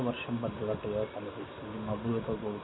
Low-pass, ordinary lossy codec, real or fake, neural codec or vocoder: 7.2 kHz; AAC, 16 kbps; real; none